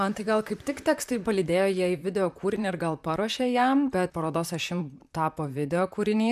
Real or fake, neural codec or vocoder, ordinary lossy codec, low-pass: fake; vocoder, 44.1 kHz, 128 mel bands, Pupu-Vocoder; MP3, 96 kbps; 14.4 kHz